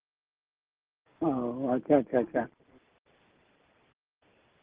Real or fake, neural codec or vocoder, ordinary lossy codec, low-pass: real; none; Opus, 16 kbps; 3.6 kHz